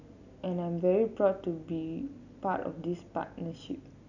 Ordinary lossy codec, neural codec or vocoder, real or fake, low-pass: none; none; real; 7.2 kHz